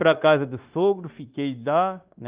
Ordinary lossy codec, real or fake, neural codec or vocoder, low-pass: Opus, 32 kbps; fake; codec, 16 kHz, 0.9 kbps, LongCat-Audio-Codec; 3.6 kHz